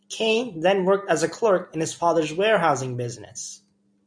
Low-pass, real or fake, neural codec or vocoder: 9.9 kHz; real; none